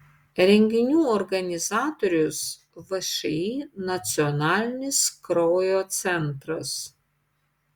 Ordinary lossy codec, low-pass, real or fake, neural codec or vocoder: Opus, 64 kbps; 19.8 kHz; real; none